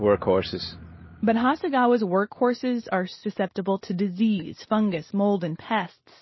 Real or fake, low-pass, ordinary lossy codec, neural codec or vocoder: real; 7.2 kHz; MP3, 24 kbps; none